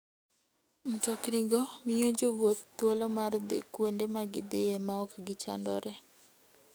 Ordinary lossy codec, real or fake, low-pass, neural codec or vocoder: none; fake; none; codec, 44.1 kHz, 7.8 kbps, DAC